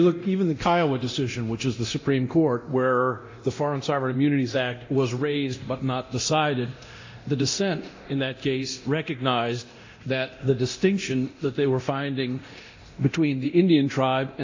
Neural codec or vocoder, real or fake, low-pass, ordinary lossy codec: codec, 24 kHz, 0.9 kbps, DualCodec; fake; 7.2 kHz; AAC, 48 kbps